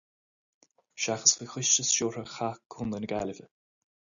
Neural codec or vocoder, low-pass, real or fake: none; 7.2 kHz; real